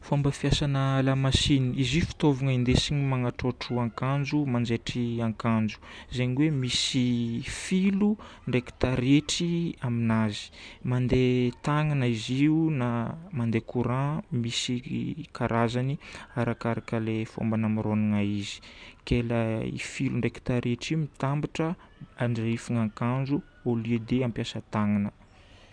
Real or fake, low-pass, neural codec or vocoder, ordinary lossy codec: real; 9.9 kHz; none; none